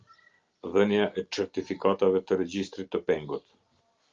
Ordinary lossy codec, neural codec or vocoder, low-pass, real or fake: Opus, 24 kbps; none; 7.2 kHz; real